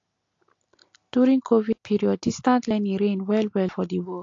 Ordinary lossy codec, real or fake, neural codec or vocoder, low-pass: AAC, 48 kbps; real; none; 7.2 kHz